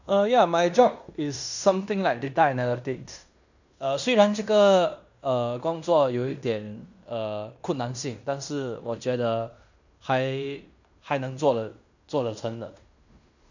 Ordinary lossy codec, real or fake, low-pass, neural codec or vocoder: none; fake; 7.2 kHz; codec, 16 kHz in and 24 kHz out, 0.9 kbps, LongCat-Audio-Codec, fine tuned four codebook decoder